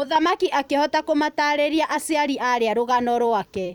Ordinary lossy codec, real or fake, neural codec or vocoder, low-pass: none; fake; vocoder, 44.1 kHz, 128 mel bands every 256 samples, BigVGAN v2; 19.8 kHz